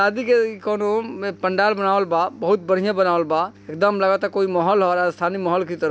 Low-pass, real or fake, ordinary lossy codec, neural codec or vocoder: none; real; none; none